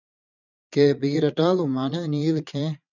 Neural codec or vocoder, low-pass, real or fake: vocoder, 22.05 kHz, 80 mel bands, Vocos; 7.2 kHz; fake